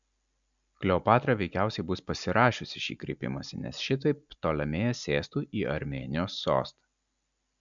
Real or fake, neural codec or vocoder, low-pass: real; none; 7.2 kHz